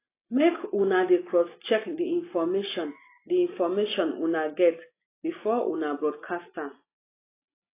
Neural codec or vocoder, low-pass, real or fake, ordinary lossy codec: none; 3.6 kHz; real; AAC, 24 kbps